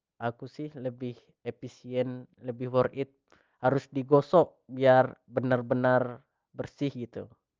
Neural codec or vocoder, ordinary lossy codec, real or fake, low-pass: none; Opus, 24 kbps; real; 7.2 kHz